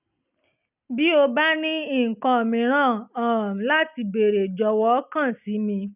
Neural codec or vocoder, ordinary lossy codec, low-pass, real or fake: none; none; 3.6 kHz; real